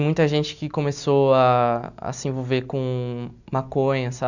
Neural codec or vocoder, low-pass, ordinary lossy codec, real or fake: none; 7.2 kHz; none; real